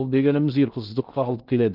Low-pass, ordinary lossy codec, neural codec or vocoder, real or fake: 5.4 kHz; Opus, 24 kbps; codec, 16 kHz in and 24 kHz out, 0.6 kbps, FocalCodec, streaming, 2048 codes; fake